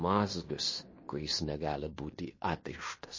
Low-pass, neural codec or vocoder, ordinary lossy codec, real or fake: 7.2 kHz; codec, 16 kHz in and 24 kHz out, 0.9 kbps, LongCat-Audio-Codec, fine tuned four codebook decoder; MP3, 32 kbps; fake